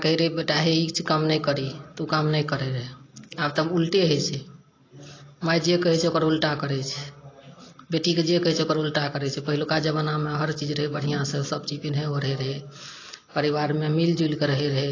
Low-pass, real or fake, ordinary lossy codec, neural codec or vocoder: 7.2 kHz; real; AAC, 32 kbps; none